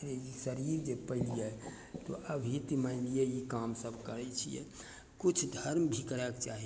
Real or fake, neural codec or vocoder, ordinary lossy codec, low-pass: real; none; none; none